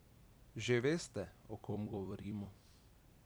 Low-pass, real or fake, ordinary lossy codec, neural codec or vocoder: none; fake; none; vocoder, 44.1 kHz, 128 mel bands every 256 samples, BigVGAN v2